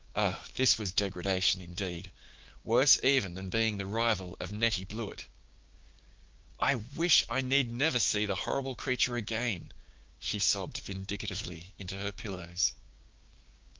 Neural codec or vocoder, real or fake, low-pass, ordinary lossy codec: codec, 16 kHz, 6 kbps, DAC; fake; 7.2 kHz; Opus, 32 kbps